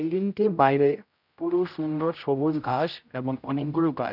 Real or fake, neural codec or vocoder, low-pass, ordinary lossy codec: fake; codec, 16 kHz, 0.5 kbps, X-Codec, HuBERT features, trained on general audio; 5.4 kHz; none